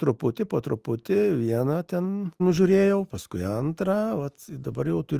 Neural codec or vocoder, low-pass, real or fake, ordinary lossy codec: autoencoder, 48 kHz, 128 numbers a frame, DAC-VAE, trained on Japanese speech; 14.4 kHz; fake; Opus, 24 kbps